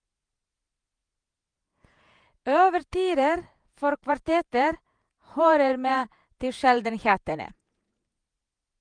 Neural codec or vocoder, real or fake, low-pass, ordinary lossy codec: vocoder, 48 kHz, 128 mel bands, Vocos; fake; 9.9 kHz; Opus, 32 kbps